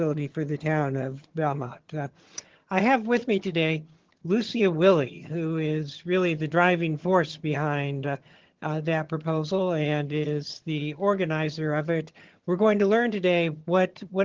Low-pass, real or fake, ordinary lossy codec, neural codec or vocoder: 7.2 kHz; fake; Opus, 16 kbps; vocoder, 22.05 kHz, 80 mel bands, HiFi-GAN